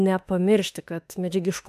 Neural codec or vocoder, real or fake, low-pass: autoencoder, 48 kHz, 32 numbers a frame, DAC-VAE, trained on Japanese speech; fake; 14.4 kHz